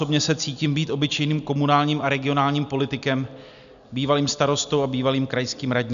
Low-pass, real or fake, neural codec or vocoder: 7.2 kHz; real; none